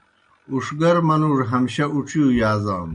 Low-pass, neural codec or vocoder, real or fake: 9.9 kHz; none; real